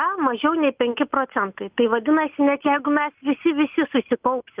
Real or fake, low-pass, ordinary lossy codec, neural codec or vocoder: real; 3.6 kHz; Opus, 24 kbps; none